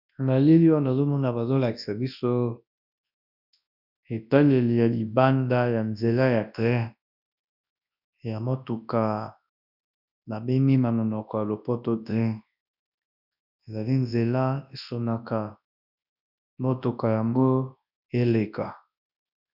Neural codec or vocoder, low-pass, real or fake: codec, 24 kHz, 0.9 kbps, WavTokenizer, large speech release; 5.4 kHz; fake